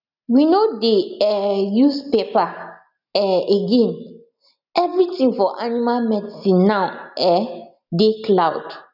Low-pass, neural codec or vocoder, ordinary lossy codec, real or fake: 5.4 kHz; none; none; real